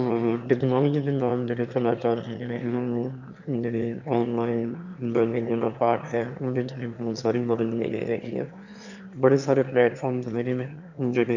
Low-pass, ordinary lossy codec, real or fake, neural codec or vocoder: 7.2 kHz; none; fake; autoencoder, 22.05 kHz, a latent of 192 numbers a frame, VITS, trained on one speaker